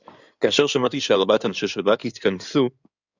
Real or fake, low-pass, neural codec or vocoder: fake; 7.2 kHz; codec, 16 kHz in and 24 kHz out, 2.2 kbps, FireRedTTS-2 codec